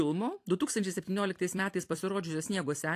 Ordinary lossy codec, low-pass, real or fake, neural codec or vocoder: AAC, 64 kbps; 14.4 kHz; fake; vocoder, 44.1 kHz, 128 mel bands every 256 samples, BigVGAN v2